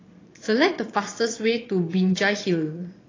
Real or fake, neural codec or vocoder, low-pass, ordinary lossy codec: fake; vocoder, 22.05 kHz, 80 mel bands, Vocos; 7.2 kHz; AAC, 32 kbps